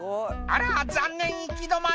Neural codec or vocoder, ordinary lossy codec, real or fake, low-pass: none; none; real; none